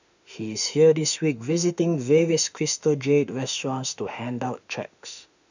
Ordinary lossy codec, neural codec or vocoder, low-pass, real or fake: none; autoencoder, 48 kHz, 32 numbers a frame, DAC-VAE, trained on Japanese speech; 7.2 kHz; fake